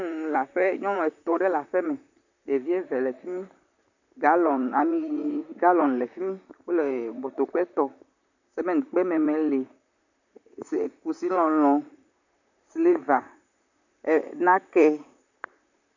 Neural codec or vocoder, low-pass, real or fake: vocoder, 44.1 kHz, 128 mel bands, Pupu-Vocoder; 7.2 kHz; fake